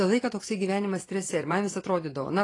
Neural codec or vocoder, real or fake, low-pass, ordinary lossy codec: vocoder, 24 kHz, 100 mel bands, Vocos; fake; 10.8 kHz; AAC, 32 kbps